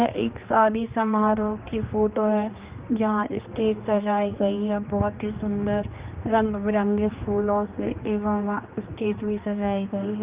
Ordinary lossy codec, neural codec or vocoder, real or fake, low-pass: Opus, 24 kbps; codec, 16 kHz, 2 kbps, X-Codec, HuBERT features, trained on general audio; fake; 3.6 kHz